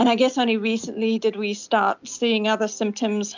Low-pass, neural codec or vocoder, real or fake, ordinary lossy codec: 7.2 kHz; none; real; MP3, 64 kbps